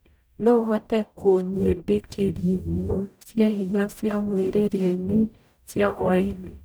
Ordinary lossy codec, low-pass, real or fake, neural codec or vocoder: none; none; fake; codec, 44.1 kHz, 0.9 kbps, DAC